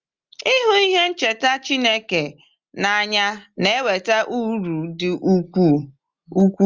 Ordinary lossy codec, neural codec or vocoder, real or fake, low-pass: Opus, 32 kbps; none; real; 7.2 kHz